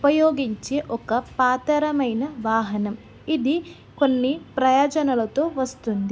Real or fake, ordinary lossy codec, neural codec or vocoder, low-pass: real; none; none; none